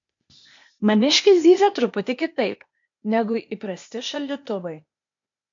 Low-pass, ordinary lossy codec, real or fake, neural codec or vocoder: 7.2 kHz; MP3, 48 kbps; fake; codec, 16 kHz, 0.8 kbps, ZipCodec